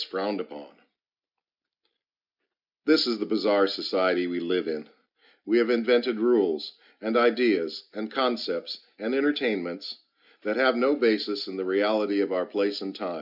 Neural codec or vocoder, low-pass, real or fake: none; 5.4 kHz; real